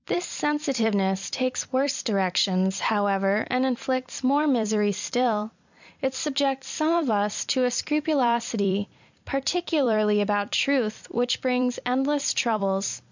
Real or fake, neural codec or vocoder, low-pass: fake; vocoder, 44.1 kHz, 128 mel bands every 256 samples, BigVGAN v2; 7.2 kHz